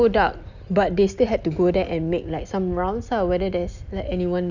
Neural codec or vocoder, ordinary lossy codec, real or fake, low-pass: none; none; real; 7.2 kHz